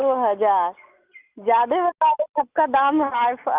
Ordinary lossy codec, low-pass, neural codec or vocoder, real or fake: Opus, 24 kbps; 3.6 kHz; none; real